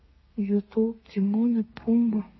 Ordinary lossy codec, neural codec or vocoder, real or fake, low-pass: MP3, 24 kbps; codec, 32 kHz, 1.9 kbps, SNAC; fake; 7.2 kHz